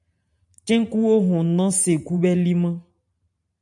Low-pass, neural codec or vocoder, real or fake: 10.8 kHz; vocoder, 44.1 kHz, 128 mel bands every 512 samples, BigVGAN v2; fake